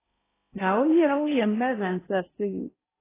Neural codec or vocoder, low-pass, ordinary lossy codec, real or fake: codec, 16 kHz in and 24 kHz out, 0.6 kbps, FocalCodec, streaming, 2048 codes; 3.6 kHz; AAC, 16 kbps; fake